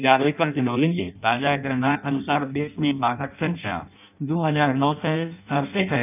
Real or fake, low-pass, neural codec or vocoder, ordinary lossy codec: fake; 3.6 kHz; codec, 16 kHz in and 24 kHz out, 0.6 kbps, FireRedTTS-2 codec; none